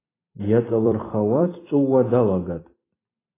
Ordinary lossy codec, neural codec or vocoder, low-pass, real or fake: AAC, 16 kbps; vocoder, 44.1 kHz, 128 mel bands every 256 samples, BigVGAN v2; 3.6 kHz; fake